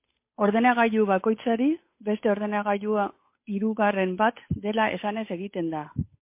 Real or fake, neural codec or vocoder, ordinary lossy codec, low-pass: fake; codec, 16 kHz, 8 kbps, FunCodec, trained on Chinese and English, 25 frames a second; MP3, 24 kbps; 3.6 kHz